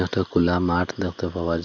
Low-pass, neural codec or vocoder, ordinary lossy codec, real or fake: 7.2 kHz; none; none; real